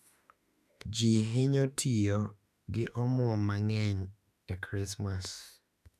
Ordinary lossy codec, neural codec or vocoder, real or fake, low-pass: none; autoencoder, 48 kHz, 32 numbers a frame, DAC-VAE, trained on Japanese speech; fake; 14.4 kHz